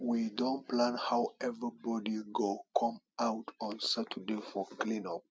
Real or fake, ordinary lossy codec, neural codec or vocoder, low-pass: real; none; none; none